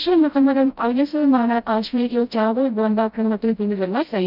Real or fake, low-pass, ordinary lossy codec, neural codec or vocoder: fake; 5.4 kHz; none; codec, 16 kHz, 0.5 kbps, FreqCodec, smaller model